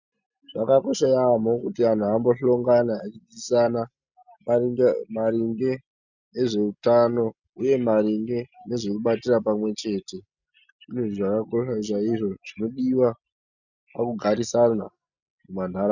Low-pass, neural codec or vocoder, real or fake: 7.2 kHz; none; real